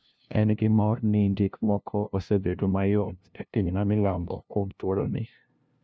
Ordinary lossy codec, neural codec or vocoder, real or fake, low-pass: none; codec, 16 kHz, 0.5 kbps, FunCodec, trained on LibriTTS, 25 frames a second; fake; none